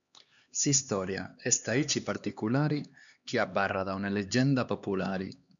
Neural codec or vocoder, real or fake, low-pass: codec, 16 kHz, 2 kbps, X-Codec, HuBERT features, trained on LibriSpeech; fake; 7.2 kHz